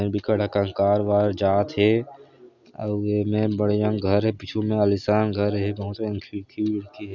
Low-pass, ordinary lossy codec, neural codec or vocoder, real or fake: 7.2 kHz; none; none; real